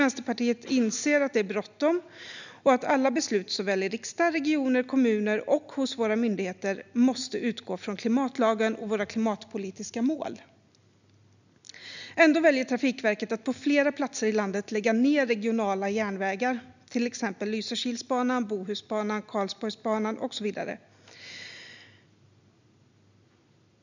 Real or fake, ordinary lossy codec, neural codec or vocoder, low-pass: real; none; none; 7.2 kHz